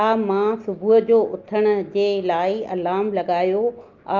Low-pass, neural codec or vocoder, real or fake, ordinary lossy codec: 7.2 kHz; none; real; Opus, 24 kbps